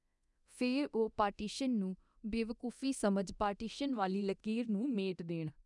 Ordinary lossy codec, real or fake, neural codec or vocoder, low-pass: none; fake; codec, 24 kHz, 0.9 kbps, DualCodec; 10.8 kHz